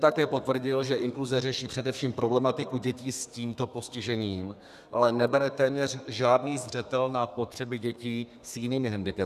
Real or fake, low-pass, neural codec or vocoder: fake; 14.4 kHz; codec, 32 kHz, 1.9 kbps, SNAC